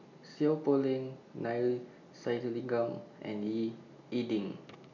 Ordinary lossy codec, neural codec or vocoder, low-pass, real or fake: none; none; 7.2 kHz; real